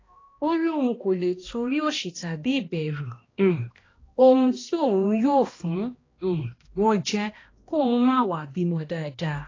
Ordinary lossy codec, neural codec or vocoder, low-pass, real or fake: AAC, 32 kbps; codec, 16 kHz, 1 kbps, X-Codec, HuBERT features, trained on balanced general audio; 7.2 kHz; fake